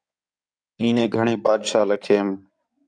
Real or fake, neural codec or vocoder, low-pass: fake; codec, 16 kHz in and 24 kHz out, 2.2 kbps, FireRedTTS-2 codec; 9.9 kHz